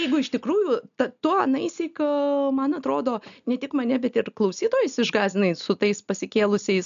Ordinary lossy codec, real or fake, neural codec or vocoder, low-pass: MP3, 96 kbps; real; none; 7.2 kHz